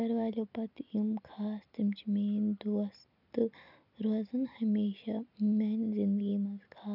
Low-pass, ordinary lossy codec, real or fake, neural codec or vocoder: 5.4 kHz; none; real; none